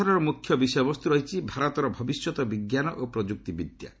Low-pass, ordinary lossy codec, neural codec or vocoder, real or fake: none; none; none; real